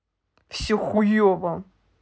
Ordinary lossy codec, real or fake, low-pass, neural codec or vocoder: none; real; none; none